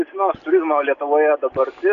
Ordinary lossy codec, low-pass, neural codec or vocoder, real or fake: AAC, 96 kbps; 7.2 kHz; none; real